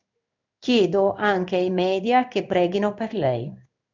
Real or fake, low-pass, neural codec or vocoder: fake; 7.2 kHz; codec, 16 kHz in and 24 kHz out, 1 kbps, XY-Tokenizer